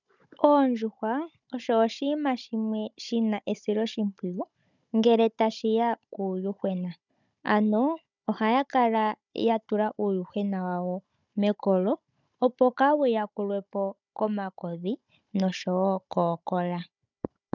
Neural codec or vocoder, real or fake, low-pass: codec, 16 kHz, 16 kbps, FunCodec, trained on Chinese and English, 50 frames a second; fake; 7.2 kHz